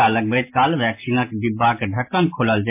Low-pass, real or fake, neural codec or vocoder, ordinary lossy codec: 3.6 kHz; real; none; MP3, 24 kbps